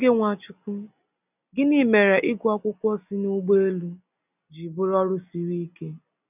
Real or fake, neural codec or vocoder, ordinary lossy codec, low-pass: real; none; none; 3.6 kHz